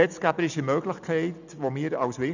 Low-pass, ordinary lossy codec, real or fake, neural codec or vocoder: 7.2 kHz; none; real; none